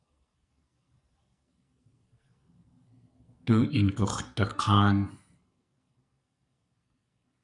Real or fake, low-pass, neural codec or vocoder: fake; 10.8 kHz; codec, 44.1 kHz, 2.6 kbps, SNAC